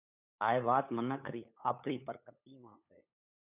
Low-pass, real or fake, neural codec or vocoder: 3.6 kHz; fake; codec, 16 kHz, 8 kbps, FunCodec, trained on LibriTTS, 25 frames a second